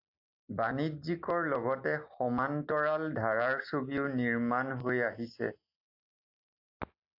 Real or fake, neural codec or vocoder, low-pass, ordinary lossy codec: real; none; 5.4 kHz; AAC, 48 kbps